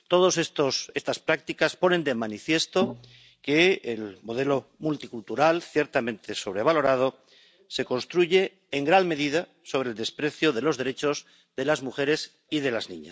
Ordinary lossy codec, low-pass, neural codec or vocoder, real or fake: none; none; none; real